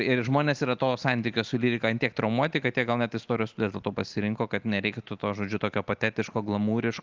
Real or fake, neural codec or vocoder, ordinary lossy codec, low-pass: real; none; Opus, 24 kbps; 7.2 kHz